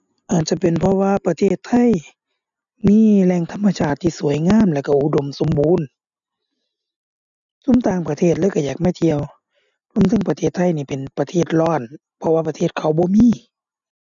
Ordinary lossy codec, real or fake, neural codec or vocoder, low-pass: none; real; none; 7.2 kHz